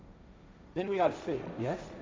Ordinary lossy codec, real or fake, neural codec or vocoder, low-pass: none; fake; codec, 16 kHz, 1.1 kbps, Voila-Tokenizer; none